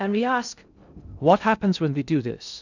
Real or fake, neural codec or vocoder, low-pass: fake; codec, 16 kHz in and 24 kHz out, 0.6 kbps, FocalCodec, streaming, 2048 codes; 7.2 kHz